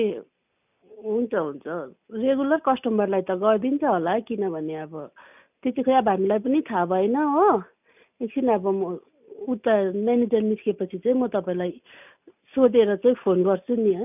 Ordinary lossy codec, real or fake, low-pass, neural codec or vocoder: none; real; 3.6 kHz; none